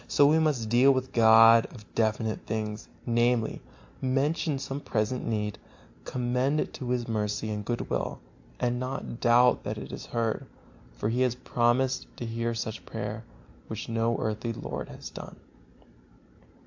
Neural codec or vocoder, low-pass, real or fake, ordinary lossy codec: none; 7.2 kHz; real; AAC, 48 kbps